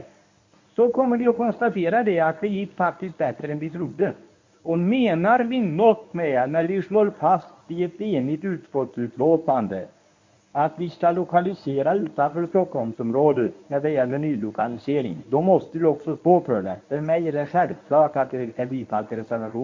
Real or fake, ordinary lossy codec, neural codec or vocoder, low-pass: fake; MP3, 48 kbps; codec, 24 kHz, 0.9 kbps, WavTokenizer, medium speech release version 1; 7.2 kHz